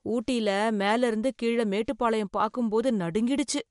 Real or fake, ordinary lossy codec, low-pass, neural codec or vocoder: real; MP3, 64 kbps; 10.8 kHz; none